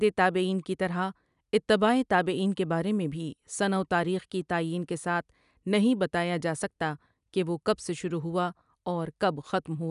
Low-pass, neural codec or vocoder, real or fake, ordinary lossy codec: 10.8 kHz; none; real; none